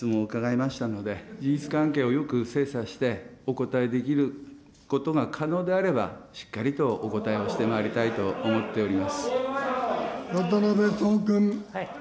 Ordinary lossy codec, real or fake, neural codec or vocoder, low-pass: none; real; none; none